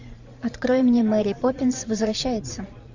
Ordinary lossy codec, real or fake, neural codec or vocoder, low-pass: Opus, 64 kbps; fake; vocoder, 44.1 kHz, 128 mel bands every 512 samples, BigVGAN v2; 7.2 kHz